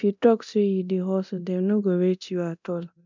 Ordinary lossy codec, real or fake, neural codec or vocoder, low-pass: none; fake; codec, 24 kHz, 0.9 kbps, DualCodec; 7.2 kHz